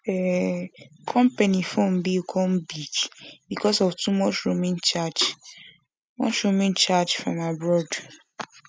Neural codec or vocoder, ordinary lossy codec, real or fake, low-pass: none; none; real; none